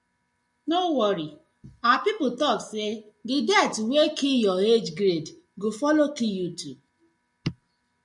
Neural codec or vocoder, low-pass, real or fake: none; 10.8 kHz; real